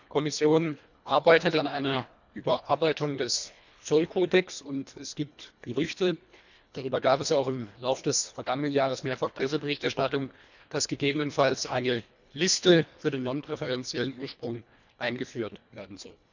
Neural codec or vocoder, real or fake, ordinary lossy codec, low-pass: codec, 24 kHz, 1.5 kbps, HILCodec; fake; none; 7.2 kHz